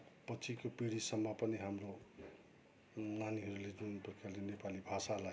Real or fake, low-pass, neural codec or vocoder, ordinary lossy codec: real; none; none; none